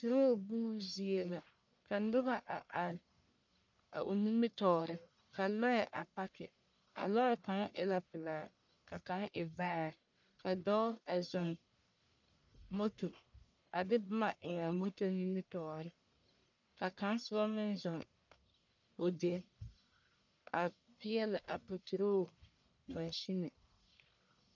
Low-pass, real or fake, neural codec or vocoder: 7.2 kHz; fake; codec, 44.1 kHz, 1.7 kbps, Pupu-Codec